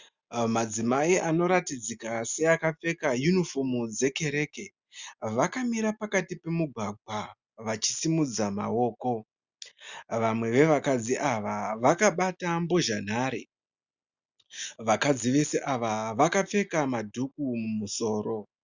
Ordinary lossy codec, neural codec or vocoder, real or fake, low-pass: Opus, 64 kbps; none; real; 7.2 kHz